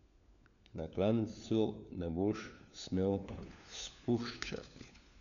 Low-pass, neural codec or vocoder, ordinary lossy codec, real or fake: 7.2 kHz; codec, 16 kHz, 4 kbps, FunCodec, trained on LibriTTS, 50 frames a second; none; fake